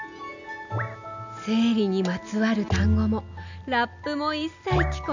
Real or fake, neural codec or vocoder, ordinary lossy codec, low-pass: real; none; none; 7.2 kHz